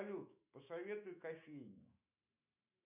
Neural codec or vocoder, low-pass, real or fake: autoencoder, 48 kHz, 128 numbers a frame, DAC-VAE, trained on Japanese speech; 3.6 kHz; fake